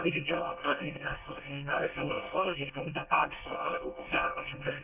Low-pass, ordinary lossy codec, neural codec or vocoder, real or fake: 3.6 kHz; none; codec, 24 kHz, 1 kbps, SNAC; fake